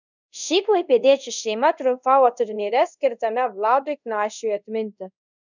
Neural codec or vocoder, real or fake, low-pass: codec, 24 kHz, 0.5 kbps, DualCodec; fake; 7.2 kHz